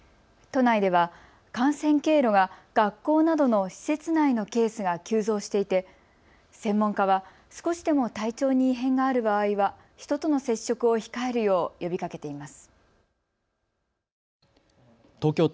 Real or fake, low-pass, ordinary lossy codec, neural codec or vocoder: real; none; none; none